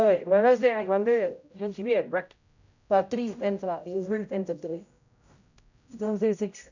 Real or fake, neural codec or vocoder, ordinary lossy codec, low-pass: fake; codec, 16 kHz, 0.5 kbps, X-Codec, HuBERT features, trained on general audio; none; 7.2 kHz